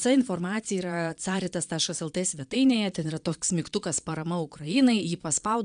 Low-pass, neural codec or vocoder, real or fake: 9.9 kHz; vocoder, 22.05 kHz, 80 mel bands, Vocos; fake